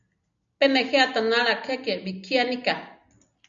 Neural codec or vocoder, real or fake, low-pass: none; real; 7.2 kHz